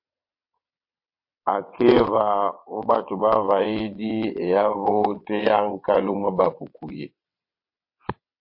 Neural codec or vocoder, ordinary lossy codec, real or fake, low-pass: vocoder, 22.05 kHz, 80 mel bands, WaveNeXt; MP3, 32 kbps; fake; 5.4 kHz